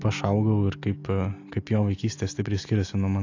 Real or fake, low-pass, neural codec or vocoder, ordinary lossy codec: real; 7.2 kHz; none; AAC, 48 kbps